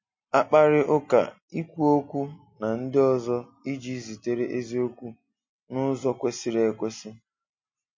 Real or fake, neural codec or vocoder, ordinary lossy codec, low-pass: real; none; MP3, 32 kbps; 7.2 kHz